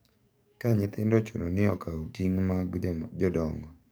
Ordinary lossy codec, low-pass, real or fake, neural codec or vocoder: none; none; fake; codec, 44.1 kHz, 7.8 kbps, DAC